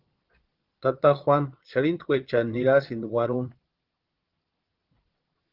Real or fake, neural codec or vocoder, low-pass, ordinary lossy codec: fake; vocoder, 22.05 kHz, 80 mel bands, Vocos; 5.4 kHz; Opus, 16 kbps